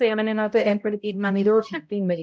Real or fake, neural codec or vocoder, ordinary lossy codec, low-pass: fake; codec, 16 kHz, 0.5 kbps, X-Codec, HuBERT features, trained on balanced general audio; none; none